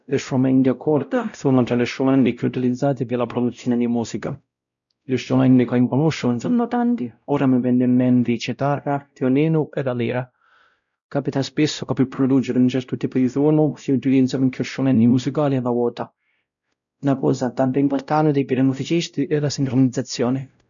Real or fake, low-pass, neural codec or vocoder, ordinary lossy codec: fake; 7.2 kHz; codec, 16 kHz, 0.5 kbps, X-Codec, WavLM features, trained on Multilingual LibriSpeech; none